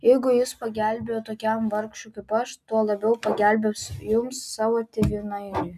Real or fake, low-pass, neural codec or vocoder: real; 14.4 kHz; none